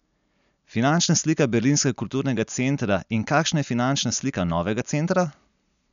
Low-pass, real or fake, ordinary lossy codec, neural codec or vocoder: 7.2 kHz; real; none; none